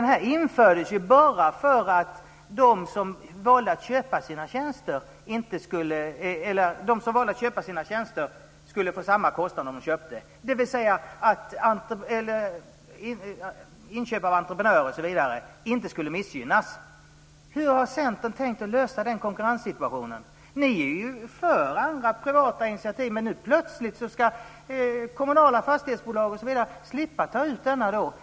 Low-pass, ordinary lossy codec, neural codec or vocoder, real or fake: none; none; none; real